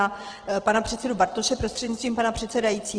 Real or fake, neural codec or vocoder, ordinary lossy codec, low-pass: real; none; Opus, 16 kbps; 9.9 kHz